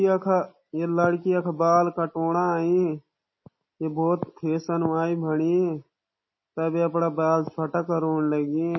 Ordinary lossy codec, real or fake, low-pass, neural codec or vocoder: MP3, 24 kbps; real; 7.2 kHz; none